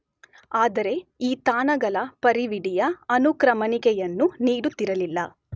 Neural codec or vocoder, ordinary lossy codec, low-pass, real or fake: none; none; none; real